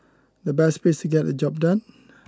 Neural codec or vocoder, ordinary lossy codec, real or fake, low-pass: none; none; real; none